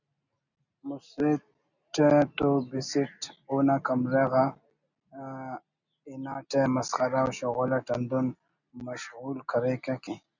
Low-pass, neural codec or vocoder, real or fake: 7.2 kHz; none; real